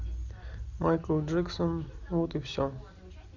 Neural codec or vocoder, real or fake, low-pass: none; real; 7.2 kHz